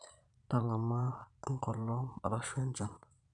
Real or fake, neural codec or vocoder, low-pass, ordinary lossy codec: fake; vocoder, 22.05 kHz, 80 mel bands, Vocos; 9.9 kHz; none